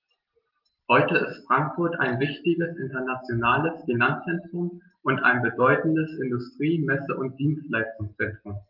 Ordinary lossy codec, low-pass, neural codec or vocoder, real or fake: Opus, 32 kbps; 5.4 kHz; none; real